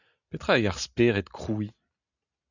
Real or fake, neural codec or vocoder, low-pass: real; none; 7.2 kHz